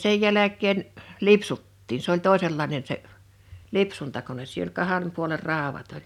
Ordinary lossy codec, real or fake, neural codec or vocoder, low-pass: none; real; none; 19.8 kHz